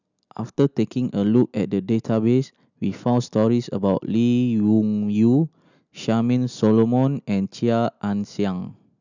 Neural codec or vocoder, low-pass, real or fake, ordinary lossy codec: none; 7.2 kHz; real; none